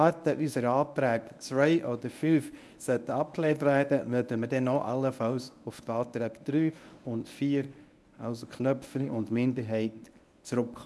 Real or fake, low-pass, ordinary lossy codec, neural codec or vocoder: fake; none; none; codec, 24 kHz, 0.9 kbps, WavTokenizer, medium speech release version 1